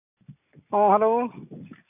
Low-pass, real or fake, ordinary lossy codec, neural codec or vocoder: 3.6 kHz; real; none; none